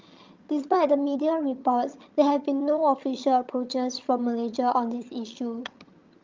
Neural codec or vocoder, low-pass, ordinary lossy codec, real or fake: vocoder, 22.05 kHz, 80 mel bands, HiFi-GAN; 7.2 kHz; Opus, 24 kbps; fake